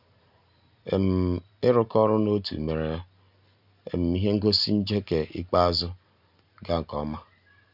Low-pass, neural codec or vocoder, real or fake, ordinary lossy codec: 5.4 kHz; none; real; none